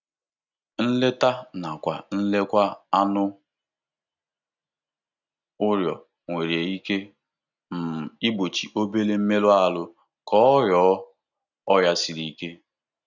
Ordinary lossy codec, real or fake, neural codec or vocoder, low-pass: none; real; none; 7.2 kHz